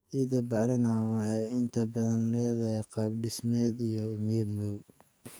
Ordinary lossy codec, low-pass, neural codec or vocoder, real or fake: none; none; codec, 44.1 kHz, 2.6 kbps, SNAC; fake